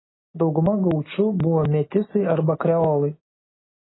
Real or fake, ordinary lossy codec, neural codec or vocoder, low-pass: real; AAC, 16 kbps; none; 7.2 kHz